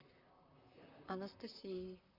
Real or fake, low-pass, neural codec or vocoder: fake; 5.4 kHz; vocoder, 44.1 kHz, 128 mel bands, Pupu-Vocoder